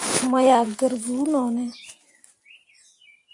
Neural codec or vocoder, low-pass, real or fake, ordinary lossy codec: vocoder, 44.1 kHz, 128 mel bands every 512 samples, BigVGAN v2; 10.8 kHz; fake; AAC, 64 kbps